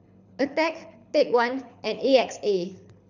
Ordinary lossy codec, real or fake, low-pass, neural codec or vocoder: none; fake; 7.2 kHz; codec, 24 kHz, 6 kbps, HILCodec